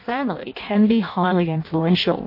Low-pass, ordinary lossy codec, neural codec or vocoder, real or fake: 5.4 kHz; MP3, 32 kbps; codec, 16 kHz in and 24 kHz out, 0.6 kbps, FireRedTTS-2 codec; fake